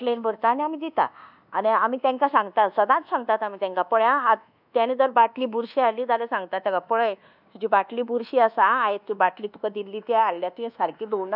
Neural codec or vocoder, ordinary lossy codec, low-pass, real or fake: codec, 24 kHz, 1.2 kbps, DualCodec; none; 5.4 kHz; fake